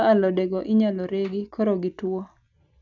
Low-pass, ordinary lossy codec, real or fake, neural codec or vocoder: 7.2 kHz; none; real; none